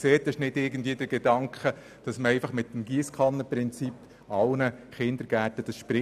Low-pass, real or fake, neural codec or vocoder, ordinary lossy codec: 14.4 kHz; real; none; none